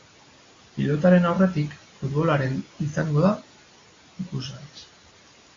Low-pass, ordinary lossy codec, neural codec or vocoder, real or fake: 7.2 kHz; AAC, 32 kbps; none; real